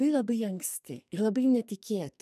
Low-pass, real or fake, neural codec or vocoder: 14.4 kHz; fake; codec, 44.1 kHz, 2.6 kbps, SNAC